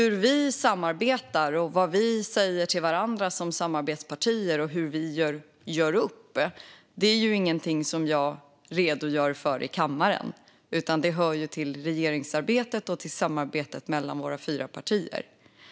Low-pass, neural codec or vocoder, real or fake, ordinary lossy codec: none; none; real; none